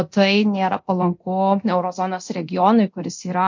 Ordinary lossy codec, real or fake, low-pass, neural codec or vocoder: MP3, 48 kbps; fake; 7.2 kHz; codec, 24 kHz, 0.9 kbps, DualCodec